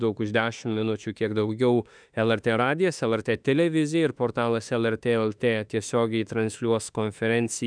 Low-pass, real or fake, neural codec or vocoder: 9.9 kHz; fake; autoencoder, 48 kHz, 32 numbers a frame, DAC-VAE, trained on Japanese speech